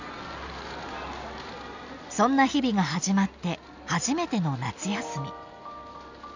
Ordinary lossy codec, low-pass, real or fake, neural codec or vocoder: none; 7.2 kHz; real; none